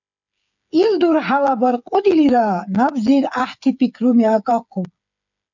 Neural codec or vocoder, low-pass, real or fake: codec, 16 kHz, 8 kbps, FreqCodec, smaller model; 7.2 kHz; fake